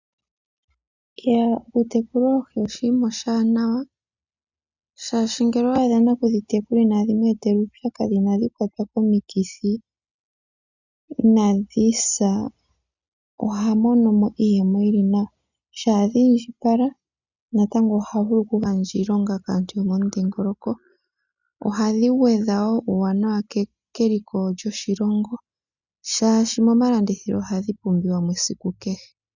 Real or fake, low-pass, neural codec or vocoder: real; 7.2 kHz; none